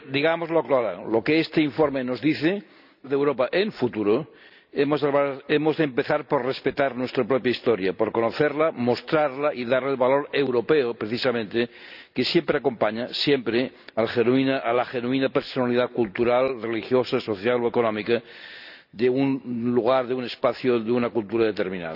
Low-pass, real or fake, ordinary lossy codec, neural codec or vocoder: 5.4 kHz; real; none; none